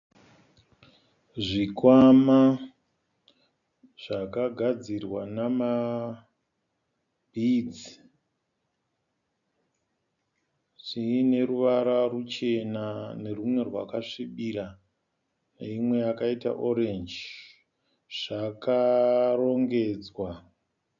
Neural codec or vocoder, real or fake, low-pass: none; real; 7.2 kHz